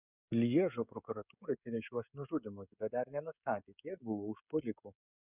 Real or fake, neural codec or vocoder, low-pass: fake; codec, 16 kHz, 16 kbps, FreqCodec, smaller model; 3.6 kHz